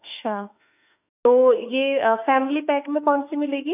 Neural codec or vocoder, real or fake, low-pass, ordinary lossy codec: autoencoder, 48 kHz, 32 numbers a frame, DAC-VAE, trained on Japanese speech; fake; 3.6 kHz; none